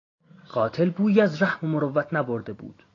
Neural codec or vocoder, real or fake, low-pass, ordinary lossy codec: none; real; 7.2 kHz; AAC, 32 kbps